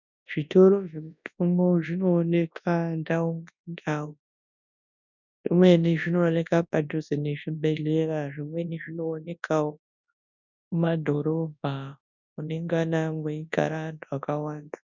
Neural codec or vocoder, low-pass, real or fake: codec, 24 kHz, 0.9 kbps, WavTokenizer, large speech release; 7.2 kHz; fake